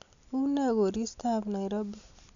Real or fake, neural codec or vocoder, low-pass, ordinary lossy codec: real; none; 7.2 kHz; none